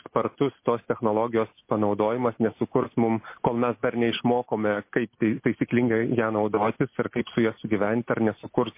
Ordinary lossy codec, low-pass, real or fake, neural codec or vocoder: MP3, 24 kbps; 3.6 kHz; real; none